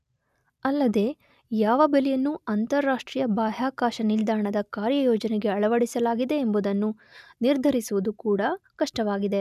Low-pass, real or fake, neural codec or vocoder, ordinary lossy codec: 14.4 kHz; real; none; none